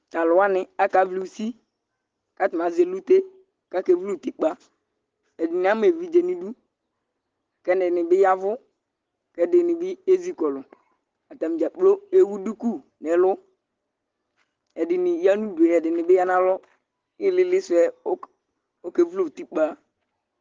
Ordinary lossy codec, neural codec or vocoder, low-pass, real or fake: Opus, 16 kbps; none; 7.2 kHz; real